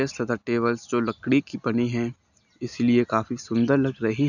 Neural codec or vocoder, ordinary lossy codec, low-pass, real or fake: none; none; 7.2 kHz; real